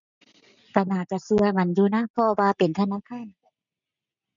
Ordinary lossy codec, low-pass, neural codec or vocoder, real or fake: none; 7.2 kHz; none; real